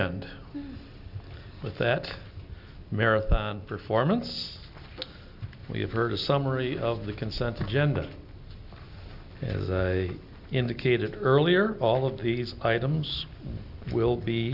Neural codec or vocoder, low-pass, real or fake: none; 5.4 kHz; real